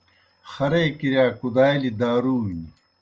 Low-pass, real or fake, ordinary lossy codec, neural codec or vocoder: 7.2 kHz; real; Opus, 24 kbps; none